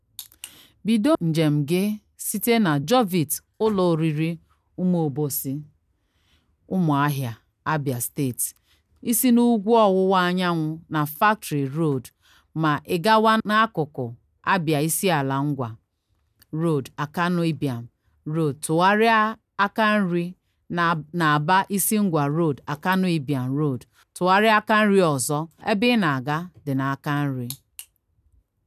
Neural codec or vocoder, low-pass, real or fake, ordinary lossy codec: none; 14.4 kHz; real; none